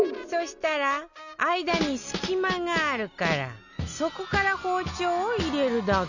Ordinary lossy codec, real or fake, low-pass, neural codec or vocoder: none; real; 7.2 kHz; none